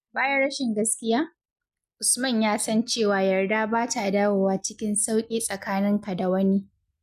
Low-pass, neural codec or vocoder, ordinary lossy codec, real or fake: 14.4 kHz; none; none; real